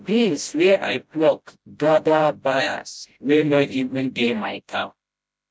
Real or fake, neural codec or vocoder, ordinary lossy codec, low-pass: fake; codec, 16 kHz, 0.5 kbps, FreqCodec, smaller model; none; none